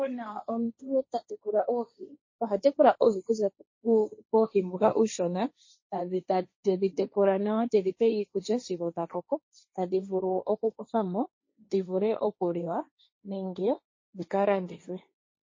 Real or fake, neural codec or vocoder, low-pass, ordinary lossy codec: fake; codec, 16 kHz, 1.1 kbps, Voila-Tokenizer; 7.2 kHz; MP3, 32 kbps